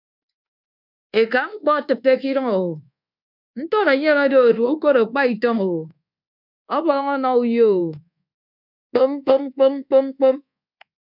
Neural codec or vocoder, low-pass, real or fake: codec, 24 kHz, 1.2 kbps, DualCodec; 5.4 kHz; fake